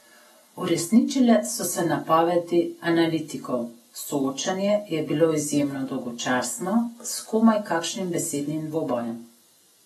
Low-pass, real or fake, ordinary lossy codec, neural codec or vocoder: 19.8 kHz; real; AAC, 32 kbps; none